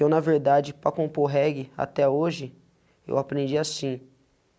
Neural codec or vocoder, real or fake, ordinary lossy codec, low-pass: none; real; none; none